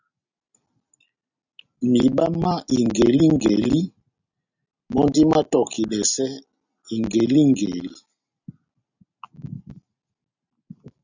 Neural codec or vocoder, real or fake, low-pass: none; real; 7.2 kHz